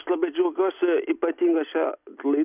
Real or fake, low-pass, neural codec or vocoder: real; 3.6 kHz; none